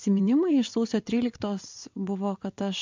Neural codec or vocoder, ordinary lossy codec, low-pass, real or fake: vocoder, 22.05 kHz, 80 mel bands, WaveNeXt; MP3, 64 kbps; 7.2 kHz; fake